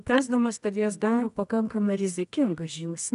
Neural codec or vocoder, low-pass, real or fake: codec, 24 kHz, 0.9 kbps, WavTokenizer, medium music audio release; 10.8 kHz; fake